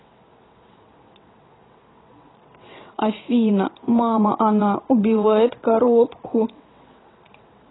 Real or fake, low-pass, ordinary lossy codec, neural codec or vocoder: fake; 7.2 kHz; AAC, 16 kbps; vocoder, 22.05 kHz, 80 mel bands, WaveNeXt